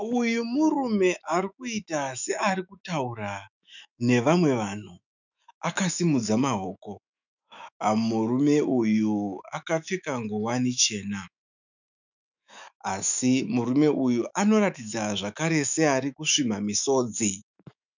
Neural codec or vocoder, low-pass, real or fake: autoencoder, 48 kHz, 128 numbers a frame, DAC-VAE, trained on Japanese speech; 7.2 kHz; fake